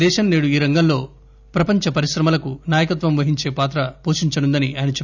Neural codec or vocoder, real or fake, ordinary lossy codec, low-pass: none; real; none; 7.2 kHz